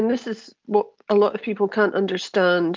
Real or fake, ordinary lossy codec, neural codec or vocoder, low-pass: real; Opus, 24 kbps; none; 7.2 kHz